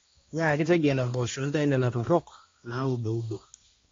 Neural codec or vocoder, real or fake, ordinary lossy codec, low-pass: codec, 16 kHz, 1 kbps, X-Codec, HuBERT features, trained on balanced general audio; fake; AAC, 32 kbps; 7.2 kHz